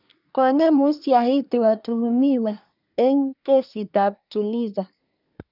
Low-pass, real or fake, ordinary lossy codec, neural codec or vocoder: 5.4 kHz; fake; none; codec, 24 kHz, 1 kbps, SNAC